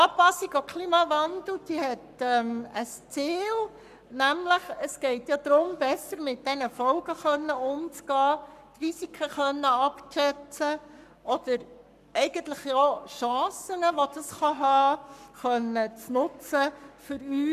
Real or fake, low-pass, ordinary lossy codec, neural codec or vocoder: fake; 14.4 kHz; none; codec, 44.1 kHz, 7.8 kbps, Pupu-Codec